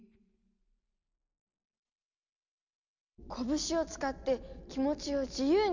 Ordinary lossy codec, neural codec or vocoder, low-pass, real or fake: none; none; 7.2 kHz; real